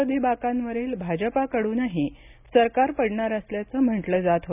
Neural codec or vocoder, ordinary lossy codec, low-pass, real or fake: none; none; 3.6 kHz; real